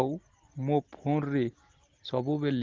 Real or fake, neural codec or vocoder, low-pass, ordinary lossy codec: real; none; 7.2 kHz; Opus, 16 kbps